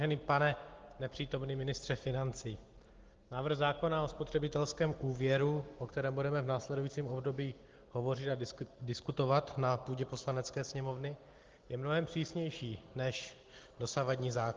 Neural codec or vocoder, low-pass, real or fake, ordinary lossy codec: none; 7.2 kHz; real; Opus, 16 kbps